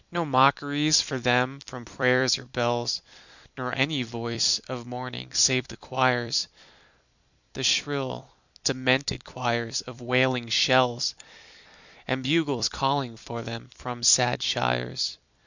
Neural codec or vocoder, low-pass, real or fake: vocoder, 44.1 kHz, 128 mel bands every 256 samples, BigVGAN v2; 7.2 kHz; fake